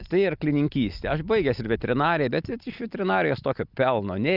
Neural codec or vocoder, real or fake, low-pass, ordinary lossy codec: none; real; 5.4 kHz; Opus, 24 kbps